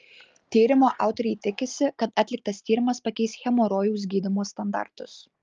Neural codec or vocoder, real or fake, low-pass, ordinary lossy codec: none; real; 7.2 kHz; Opus, 24 kbps